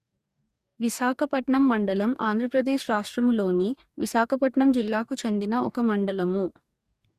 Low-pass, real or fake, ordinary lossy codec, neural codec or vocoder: 14.4 kHz; fake; Opus, 64 kbps; codec, 44.1 kHz, 2.6 kbps, DAC